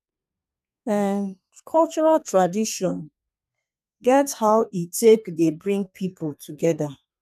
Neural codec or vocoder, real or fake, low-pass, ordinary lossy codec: codec, 32 kHz, 1.9 kbps, SNAC; fake; 14.4 kHz; none